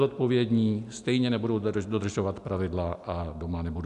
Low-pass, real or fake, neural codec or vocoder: 10.8 kHz; real; none